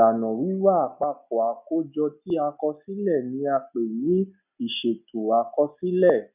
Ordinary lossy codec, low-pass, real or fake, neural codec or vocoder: none; 3.6 kHz; real; none